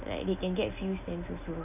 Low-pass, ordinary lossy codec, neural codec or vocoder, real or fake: 3.6 kHz; none; none; real